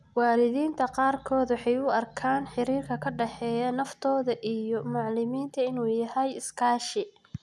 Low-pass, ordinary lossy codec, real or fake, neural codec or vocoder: none; none; real; none